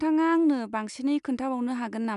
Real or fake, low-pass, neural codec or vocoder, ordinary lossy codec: real; 10.8 kHz; none; none